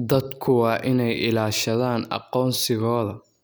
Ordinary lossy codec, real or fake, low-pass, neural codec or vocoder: none; real; none; none